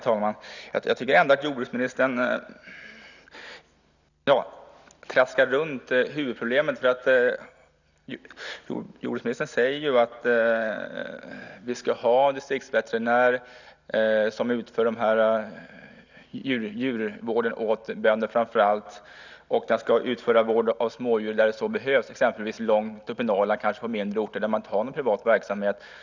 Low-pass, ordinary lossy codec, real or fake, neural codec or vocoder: 7.2 kHz; none; real; none